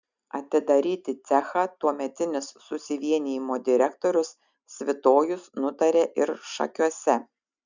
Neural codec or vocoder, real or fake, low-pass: none; real; 7.2 kHz